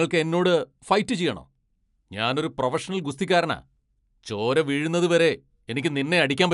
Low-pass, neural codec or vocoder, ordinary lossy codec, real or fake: 10.8 kHz; none; none; real